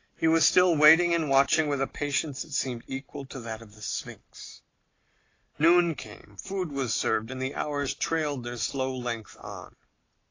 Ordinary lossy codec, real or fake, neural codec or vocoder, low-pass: AAC, 32 kbps; real; none; 7.2 kHz